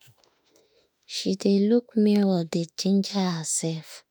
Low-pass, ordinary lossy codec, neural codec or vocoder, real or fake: none; none; autoencoder, 48 kHz, 32 numbers a frame, DAC-VAE, trained on Japanese speech; fake